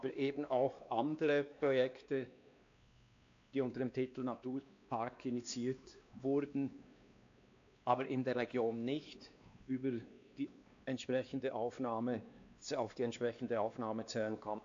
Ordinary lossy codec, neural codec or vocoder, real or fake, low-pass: AAC, 64 kbps; codec, 16 kHz, 2 kbps, X-Codec, WavLM features, trained on Multilingual LibriSpeech; fake; 7.2 kHz